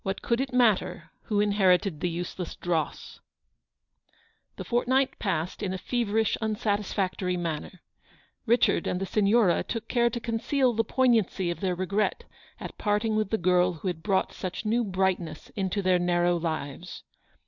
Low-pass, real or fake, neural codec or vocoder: 7.2 kHz; real; none